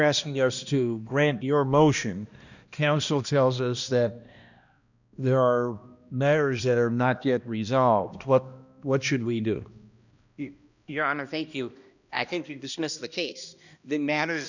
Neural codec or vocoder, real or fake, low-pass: codec, 16 kHz, 1 kbps, X-Codec, HuBERT features, trained on balanced general audio; fake; 7.2 kHz